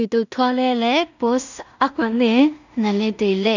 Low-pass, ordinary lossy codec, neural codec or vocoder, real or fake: 7.2 kHz; none; codec, 16 kHz in and 24 kHz out, 0.4 kbps, LongCat-Audio-Codec, two codebook decoder; fake